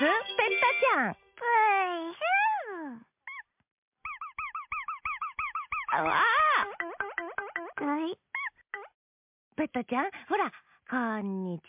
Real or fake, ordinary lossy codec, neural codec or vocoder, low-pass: real; MP3, 32 kbps; none; 3.6 kHz